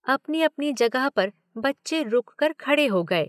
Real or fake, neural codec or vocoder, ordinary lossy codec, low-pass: real; none; none; 14.4 kHz